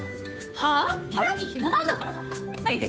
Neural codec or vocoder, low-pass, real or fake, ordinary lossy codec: codec, 16 kHz, 2 kbps, FunCodec, trained on Chinese and English, 25 frames a second; none; fake; none